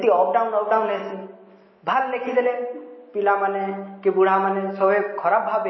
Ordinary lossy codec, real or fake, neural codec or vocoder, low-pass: MP3, 24 kbps; real; none; 7.2 kHz